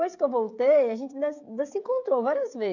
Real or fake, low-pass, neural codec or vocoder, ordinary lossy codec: fake; 7.2 kHz; codec, 16 kHz, 16 kbps, FreqCodec, smaller model; none